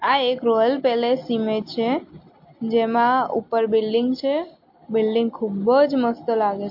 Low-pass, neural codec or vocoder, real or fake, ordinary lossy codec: 5.4 kHz; none; real; MP3, 32 kbps